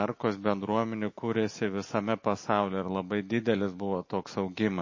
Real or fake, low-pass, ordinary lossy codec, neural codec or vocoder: real; 7.2 kHz; MP3, 32 kbps; none